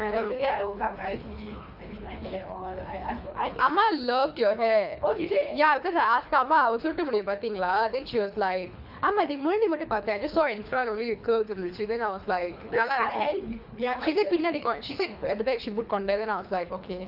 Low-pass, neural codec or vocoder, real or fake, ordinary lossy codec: 5.4 kHz; codec, 24 kHz, 3 kbps, HILCodec; fake; none